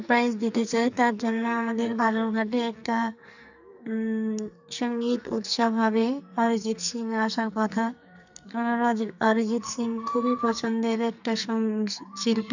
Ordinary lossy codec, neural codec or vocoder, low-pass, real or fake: none; codec, 44.1 kHz, 2.6 kbps, SNAC; 7.2 kHz; fake